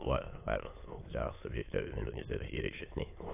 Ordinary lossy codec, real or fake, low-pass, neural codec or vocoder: AAC, 24 kbps; fake; 3.6 kHz; autoencoder, 22.05 kHz, a latent of 192 numbers a frame, VITS, trained on many speakers